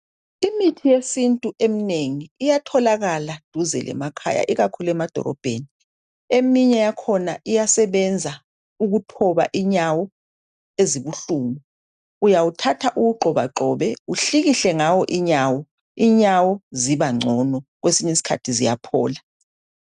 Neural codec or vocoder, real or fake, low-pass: none; real; 10.8 kHz